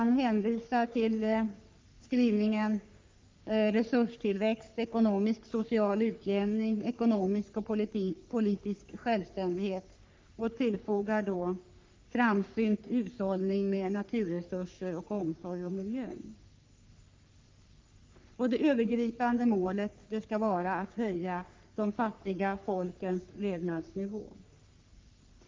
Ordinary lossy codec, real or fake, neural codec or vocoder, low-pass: Opus, 24 kbps; fake; codec, 44.1 kHz, 3.4 kbps, Pupu-Codec; 7.2 kHz